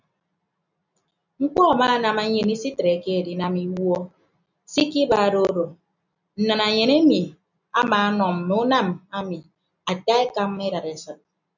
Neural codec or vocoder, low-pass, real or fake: none; 7.2 kHz; real